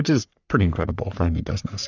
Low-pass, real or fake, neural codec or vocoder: 7.2 kHz; fake; codec, 44.1 kHz, 3.4 kbps, Pupu-Codec